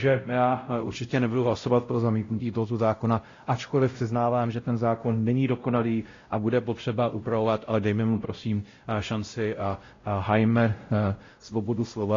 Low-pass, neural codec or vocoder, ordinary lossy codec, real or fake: 7.2 kHz; codec, 16 kHz, 0.5 kbps, X-Codec, WavLM features, trained on Multilingual LibriSpeech; AAC, 32 kbps; fake